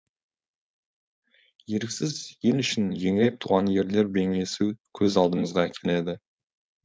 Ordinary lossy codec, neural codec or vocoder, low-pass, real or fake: none; codec, 16 kHz, 4.8 kbps, FACodec; none; fake